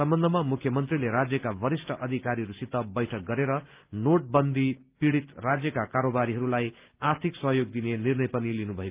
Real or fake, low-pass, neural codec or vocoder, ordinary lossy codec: real; 3.6 kHz; none; Opus, 24 kbps